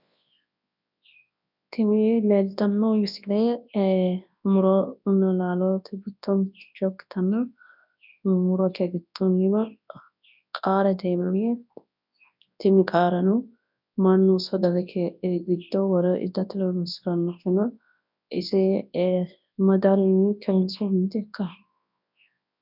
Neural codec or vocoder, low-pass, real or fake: codec, 24 kHz, 0.9 kbps, WavTokenizer, large speech release; 5.4 kHz; fake